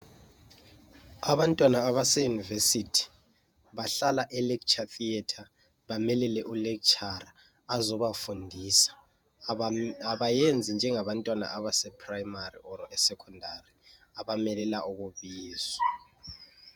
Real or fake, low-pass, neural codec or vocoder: fake; 19.8 kHz; vocoder, 48 kHz, 128 mel bands, Vocos